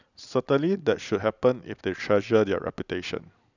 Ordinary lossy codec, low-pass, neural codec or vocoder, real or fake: none; 7.2 kHz; none; real